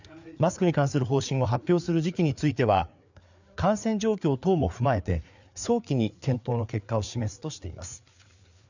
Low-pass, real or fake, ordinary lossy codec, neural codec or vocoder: 7.2 kHz; fake; none; codec, 16 kHz, 4 kbps, FreqCodec, larger model